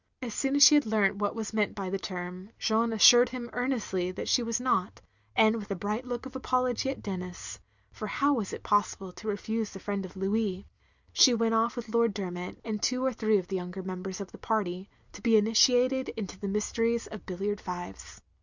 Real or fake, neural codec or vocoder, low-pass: real; none; 7.2 kHz